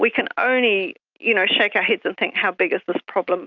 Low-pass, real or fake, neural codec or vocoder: 7.2 kHz; real; none